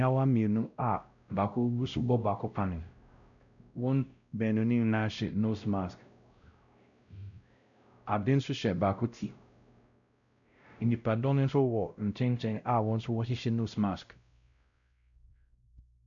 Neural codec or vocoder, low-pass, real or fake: codec, 16 kHz, 0.5 kbps, X-Codec, WavLM features, trained on Multilingual LibriSpeech; 7.2 kHz; fake